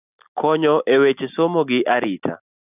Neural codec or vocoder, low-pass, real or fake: none; 3.6 kHz; real